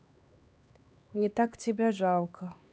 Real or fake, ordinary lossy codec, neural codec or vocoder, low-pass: fake; none; codec, 16 kHz, 2 kbps, X-Codec, HuBERT features, trained on LibriSpeech; none